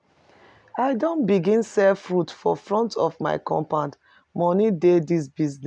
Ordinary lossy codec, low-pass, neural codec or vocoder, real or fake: none; 9.9 kHz; none; real